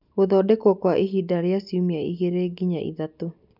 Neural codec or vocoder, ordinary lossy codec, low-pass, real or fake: none; none; 5.4 kHz; real